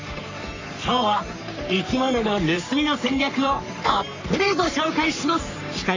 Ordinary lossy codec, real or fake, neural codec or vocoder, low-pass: AAC, 48 kbps; fake; codec, 44.1 kHz, 3.4 kbps, Pupu-Codec; 7.2 kHz